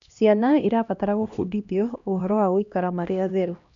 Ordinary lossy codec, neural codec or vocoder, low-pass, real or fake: none; codec, 16 kHz, 1 kbps, X-Codec, HuBERT features, trained on LibriSpeech; 7.2 kHz; fake